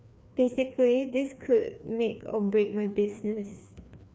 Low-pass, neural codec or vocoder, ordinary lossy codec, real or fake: none; codec, 16 kHz, 2 kbps, FreqCodec, larger model; none; fake